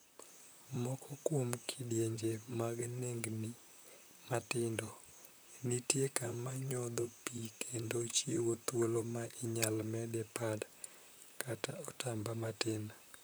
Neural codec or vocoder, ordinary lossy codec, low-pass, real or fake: vocoder, 44.1 kHz, 128 mel bands, Pupu-Vocoder; none; none; fake